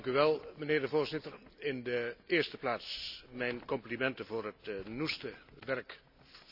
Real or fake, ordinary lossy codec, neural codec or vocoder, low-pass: real; none; none; 5.4 kHz